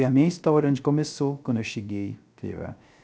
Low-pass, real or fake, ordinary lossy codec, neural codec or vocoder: none; fake; none; codec, 16 kHz, 0.3 kbps, FocalCodec